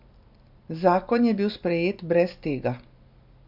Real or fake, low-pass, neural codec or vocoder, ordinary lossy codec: real; 5.4 kHz; none; MP3, 48 kbps